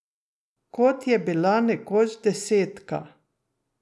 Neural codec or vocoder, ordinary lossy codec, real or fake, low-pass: none; none; real; none